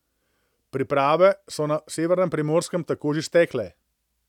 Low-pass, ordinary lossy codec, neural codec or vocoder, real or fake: 19.8 kHz; none; none; real